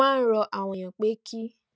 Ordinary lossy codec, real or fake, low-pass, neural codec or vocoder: none; real; none; none